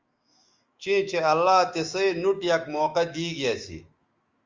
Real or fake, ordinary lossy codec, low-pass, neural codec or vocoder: fake; Opus, 32 kbps; 7.2 kHz; autoencoder, 48 kHz, 128 numbers a frame, DAC-VAE, trained on Japanese speech